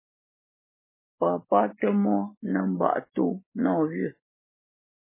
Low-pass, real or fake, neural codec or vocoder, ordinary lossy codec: 3.6 kHz; real; none; MP3, 16 kbps